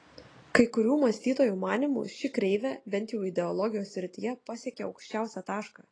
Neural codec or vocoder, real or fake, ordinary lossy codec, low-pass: none; real; AAC, 32 kbps; 9.9 kHz